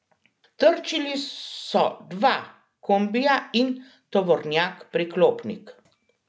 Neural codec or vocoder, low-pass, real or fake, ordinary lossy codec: none; none; real; none